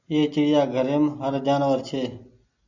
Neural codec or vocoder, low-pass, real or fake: none; 7.2 kHz; real